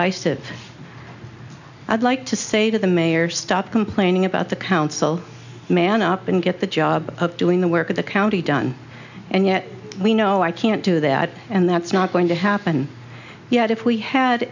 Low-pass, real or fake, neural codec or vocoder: 7.2 kHz; real; none